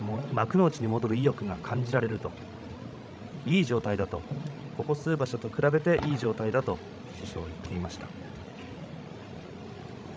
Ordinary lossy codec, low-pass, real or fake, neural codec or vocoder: none; none; fake; codec, 16 kHz, 16 kbps, FreqCodec, larger model